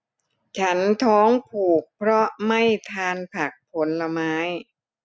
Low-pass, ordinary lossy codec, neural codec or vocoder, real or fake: none; none; none; real